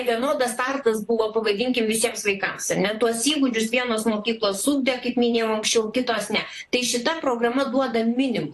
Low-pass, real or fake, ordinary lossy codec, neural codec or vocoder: 14.4 kHz; fake; AAC, 64 kbps; vocoder, 44.1 kHz, 128 mel bands, Pupu-Vocoder